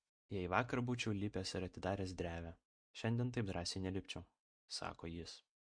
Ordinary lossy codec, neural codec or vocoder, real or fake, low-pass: MP3, 48 kbps; none; real; 9.9 kHz